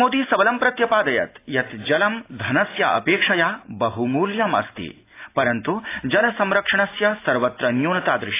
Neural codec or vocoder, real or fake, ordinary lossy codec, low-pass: none; real; AAC, 24 kbps; 3.6 kHz